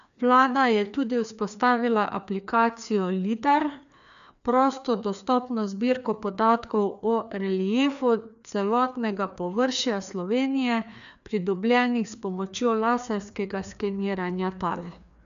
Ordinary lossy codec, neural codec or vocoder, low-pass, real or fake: none; codec, 16 kHz, 2 kbps, FreqCodec, larger model; 7.2 kHz; fake